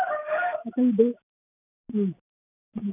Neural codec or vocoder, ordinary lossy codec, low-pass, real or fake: none; none; 3.6 kHz; real